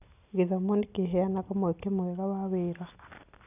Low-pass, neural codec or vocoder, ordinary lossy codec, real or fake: 3.6 kHz; none; none; real